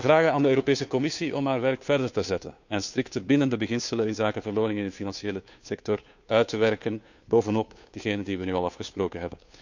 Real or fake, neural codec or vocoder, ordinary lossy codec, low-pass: fake; codec, 16 kHz, 2 kbps, FunCodec, trained on Chinese and English, 25 frames a second; none; 7.2 kHz